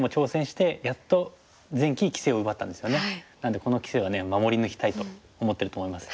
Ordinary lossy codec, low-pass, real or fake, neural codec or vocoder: none; none; real; none